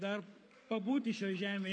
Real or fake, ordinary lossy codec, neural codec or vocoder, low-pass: fake; MP3, 48 kbps; codec, 44.1 kHz, 7.8 kbps, Pupu-Codec; 9.9 kHz